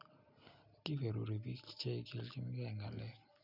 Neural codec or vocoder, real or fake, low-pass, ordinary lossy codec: none; real; 5.4 kHz; none